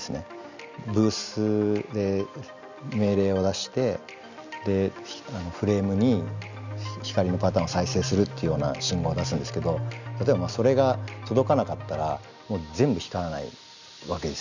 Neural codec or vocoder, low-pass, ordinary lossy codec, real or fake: none; 7.2 kHz; none; real